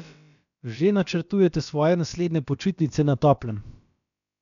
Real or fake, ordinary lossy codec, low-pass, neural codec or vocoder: fake; none; 7.2 kHz; codec, 16 kHz, about 1 kbps, DyCAST, with the encoder's durations